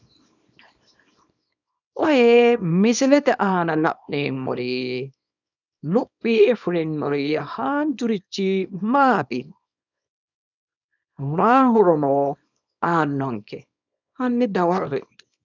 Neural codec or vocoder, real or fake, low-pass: codec, 24 kHz, 0.9 kbps, WavTokenizer, small release; fake; 7.2 kHz